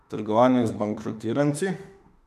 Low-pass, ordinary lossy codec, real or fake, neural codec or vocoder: 14.4 kHz; none; fake; autoencoder, 48 kHz, 32 numbers a frame, DAC-VAE, trained on Japanese speech